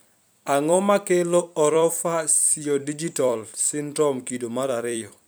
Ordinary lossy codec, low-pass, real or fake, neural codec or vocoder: none; none; fake; vocoder, 44.1 kHz, 128 mel bands every 256 samples, BigVGAN v2